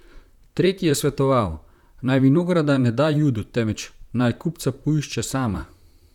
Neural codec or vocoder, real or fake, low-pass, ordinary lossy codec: vocoder, 44.1 kHz, 128 mel bands, Pupu-Vocoder; fake; 19.8 kHz; none